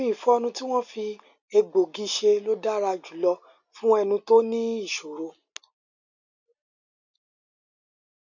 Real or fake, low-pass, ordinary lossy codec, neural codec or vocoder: real; 7.2 kHz; none; none